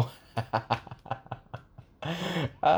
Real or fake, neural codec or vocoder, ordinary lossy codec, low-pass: real; none; none; none